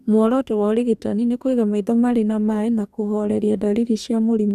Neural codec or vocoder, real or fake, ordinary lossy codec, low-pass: codec, 44.1 kHz, 2.6 kbps, DAC; fake; none; 14.4 kHz